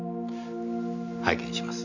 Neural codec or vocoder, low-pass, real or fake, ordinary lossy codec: none; 7.2 kHz; real; none